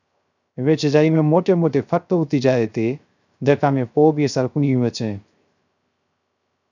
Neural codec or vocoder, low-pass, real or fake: codec, 16 kHz, 0.3 kbps, FocalCodec; 7.2 kHz; fake